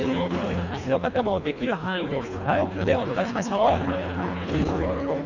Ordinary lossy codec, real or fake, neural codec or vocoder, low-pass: none; fake; codec, 24 kHz, 1.5 kbps, HILCodec; 7.2 kHz